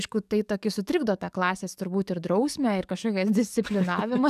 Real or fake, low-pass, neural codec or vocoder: fake; 14.4 kHz; codec, 44.1 kHz, 7.8 kbps, DAC